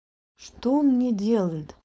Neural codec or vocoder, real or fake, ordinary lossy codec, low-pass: codec, 16 kHz, 4.8 kbps, FACodec; fake; none; none